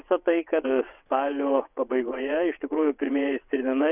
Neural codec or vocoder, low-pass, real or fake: vocoder, 22.05 kHz, 80 mel bands, WaveNeXt; 3.6 kHz; fake